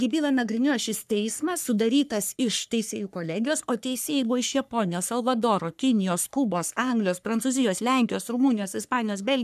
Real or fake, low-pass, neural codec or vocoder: fake; 14.4 kHz; codec, 44.1 kHz, 3.4 kbps, Pupu-Codec